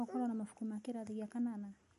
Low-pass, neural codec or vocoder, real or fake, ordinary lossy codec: 14.4 kHz; none; real; MP3, 48 kbps